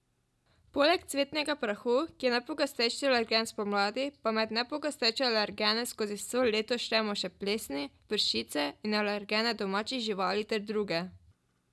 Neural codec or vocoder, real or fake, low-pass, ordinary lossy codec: none; real; none; none